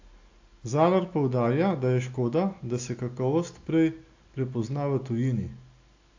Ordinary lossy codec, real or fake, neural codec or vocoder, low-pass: AAC, 48 kbps; real; none; 7.2 kHz